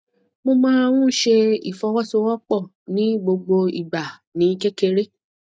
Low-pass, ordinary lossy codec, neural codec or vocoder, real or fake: none; none; none; real